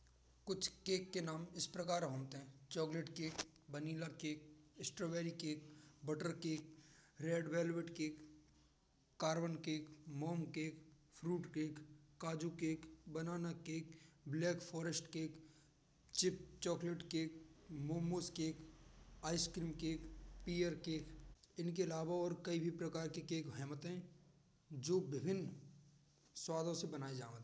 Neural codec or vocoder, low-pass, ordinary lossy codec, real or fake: none; none; none; real